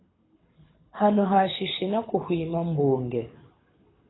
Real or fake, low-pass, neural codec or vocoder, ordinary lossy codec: fake; 7.2 kHz; codec, 24 kHz, 6 kbps, HILCodec; AAC, 16 kbps